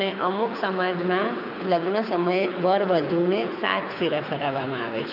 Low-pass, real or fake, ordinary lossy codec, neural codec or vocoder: 5.4 kHz; fake; none; codec, 24 kHz, 6 kbps, HILCodec